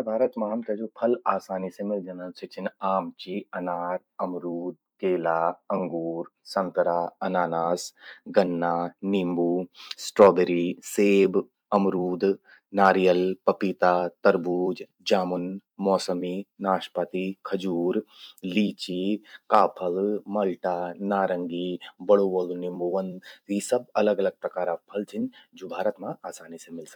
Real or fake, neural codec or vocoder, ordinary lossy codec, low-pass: fake; vocoder, 44.1 kHz, 128 mel bands every 256 samples, BigVGAN v2; none; 19.8 kHz